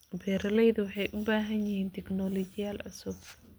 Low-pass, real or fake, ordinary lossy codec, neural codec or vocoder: none; real; none; none